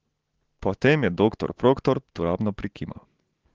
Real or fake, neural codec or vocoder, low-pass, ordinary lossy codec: real; none; 7.2 kHz; Opus, 16 kbps